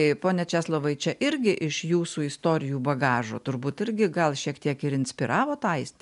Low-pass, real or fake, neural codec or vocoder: 10.8 kHz; real; none